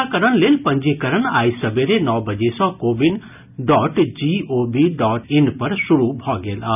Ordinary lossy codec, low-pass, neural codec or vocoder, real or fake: none; 3.6 kHz; none; real